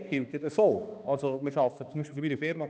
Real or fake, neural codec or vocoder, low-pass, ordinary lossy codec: fake; codec, 16 kHz, 2 kbps, X-Codec, HuBERT features, trained on balanced general audio; none; none